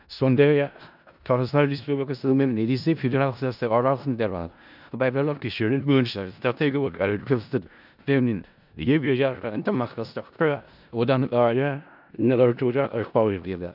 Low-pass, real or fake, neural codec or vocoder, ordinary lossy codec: 5.4 kHz; fake; codec, 16 kHz in and 24 kHz out, 0.4 kbps, LongCat-Audio-Codec, four codebook decoder; none